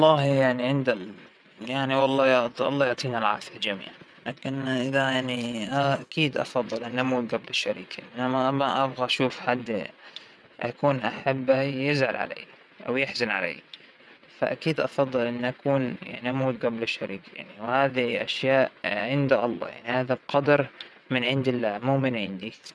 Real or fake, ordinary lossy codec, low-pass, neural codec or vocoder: fake; none; none; vocoder, 22.05 kHz, 80 mel bands, WaveNeXt